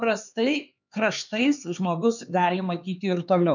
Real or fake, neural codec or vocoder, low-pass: fake; codec, 16 kHz, 4 kbps, X-Codec, HuBERT features, trained on LibriSpeech; 7.2 kHz